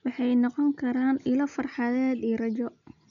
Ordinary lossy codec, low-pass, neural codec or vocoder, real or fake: none; 7.2 kHz; none; real